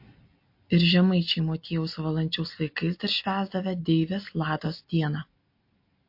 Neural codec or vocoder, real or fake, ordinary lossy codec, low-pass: none; real; MP3, 32 kbps; 5.4 kHz